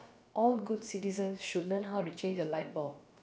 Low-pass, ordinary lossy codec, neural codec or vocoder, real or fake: none; none; codec, 16 kHz, about 1 kbps, DyCAST, with the encoder's durations; fake